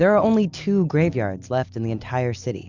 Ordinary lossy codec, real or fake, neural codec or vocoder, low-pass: Opus, 64 kbps; real; none; 7.2 kHz